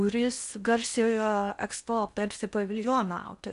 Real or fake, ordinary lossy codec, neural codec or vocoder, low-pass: fake; AAC, 96 kbps; codec, 16 kHz in and 24 kHz out, 0.8 kbps, FocalCodec, streaming, 65536 codes; 10.8 kHz